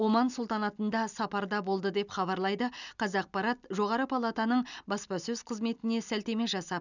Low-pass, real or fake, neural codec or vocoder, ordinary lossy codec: 7.2 kHz; real; none; none